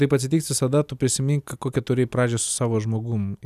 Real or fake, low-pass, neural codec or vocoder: real; 14.4 kHz; none